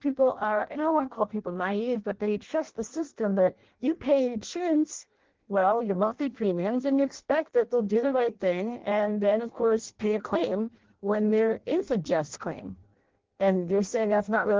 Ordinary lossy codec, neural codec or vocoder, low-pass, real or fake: Opus, 16 kbps; codec, 16 kHz in and 24 kHz out, 0.6 kbps, FireRedTTS-2 codec; 7.2 kHz; fake